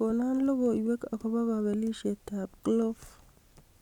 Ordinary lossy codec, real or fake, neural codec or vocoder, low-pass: none; real; none; 19.8 kHz